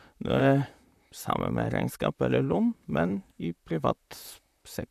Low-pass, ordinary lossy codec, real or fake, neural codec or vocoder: 14.4 kHz; none; fake; vocoder, 44.1 kHz, 128 mel bands, Pupu-Vocoder